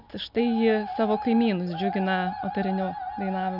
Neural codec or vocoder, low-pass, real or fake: none; 5.4 kHz; real